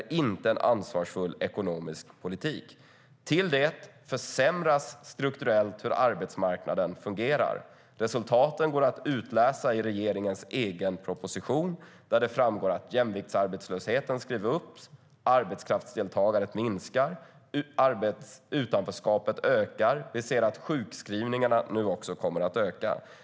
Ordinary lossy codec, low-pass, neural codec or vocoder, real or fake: none; none; none; real